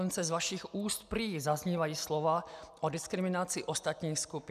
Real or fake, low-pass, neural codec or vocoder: real; 14.4 kHz; none